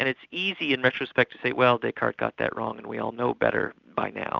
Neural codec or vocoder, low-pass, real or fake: none; 7.2 kHz; real